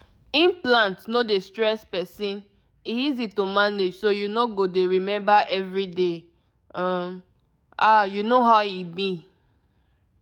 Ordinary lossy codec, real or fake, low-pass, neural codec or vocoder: none; fake; 19.8 kHz; codec, 44.1 kHz, 7.8 kbps, DAC